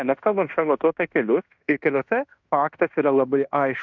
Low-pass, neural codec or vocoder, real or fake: 7.2 kHz; codec, 16 kHz in and 24 kHz out, 0.9 kbps, LongCat-Audio-Codec, fine tuned four codebook decoder; fake